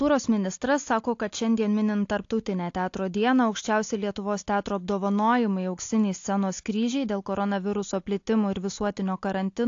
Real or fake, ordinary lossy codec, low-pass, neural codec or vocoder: real; AAC, 48 kbps; 7.2 kHz; none